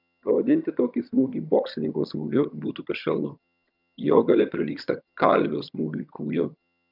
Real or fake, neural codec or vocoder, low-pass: fake; vocoder, 22.05 kHz, 80 mel bands, HiFi-GAN; 5.4 kHz